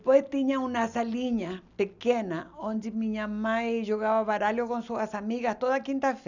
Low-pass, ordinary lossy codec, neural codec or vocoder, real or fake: 7.2 kHz; none; none; real